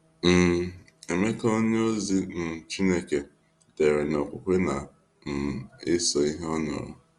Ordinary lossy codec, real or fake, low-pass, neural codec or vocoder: Opus, 32 kbps; real; 10.8 kHz; none